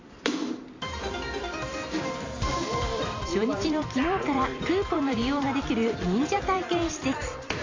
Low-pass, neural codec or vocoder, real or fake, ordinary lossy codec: 7.2 kHz; vocoder, 44.1 kHz, 128 mel bands every 256 samples, BigVGAN v2; fake; AAC, 32 kbps